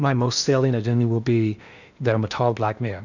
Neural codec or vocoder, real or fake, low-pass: codec, 16 kHz in and 24 kHz out, 0.8 kbps, FocalCodec, streaming, 65536 codes; fake; 7.2 kHz